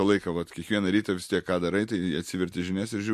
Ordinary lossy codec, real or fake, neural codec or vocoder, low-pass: MP3, 64 kbps; real; none; 14.4 kHz